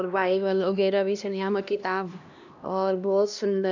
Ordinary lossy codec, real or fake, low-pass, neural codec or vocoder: none; fake; 7.2 kHz; codec, 16 kHz, 1 kbps, X-Codec, HuBERT features, trained on LibriSpeech